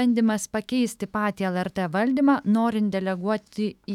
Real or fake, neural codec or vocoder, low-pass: fake; autoencoder, 48 kHz, 128 numbers a frame, DAC-VAE, trained on Japanese speech; 19.8 kHz